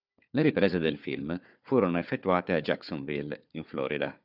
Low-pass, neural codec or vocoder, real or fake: 5.4 kHz; codec, 16 kHz, 4 kbps, FunCodec, trained on Chinese and English, 50 frames a second; fake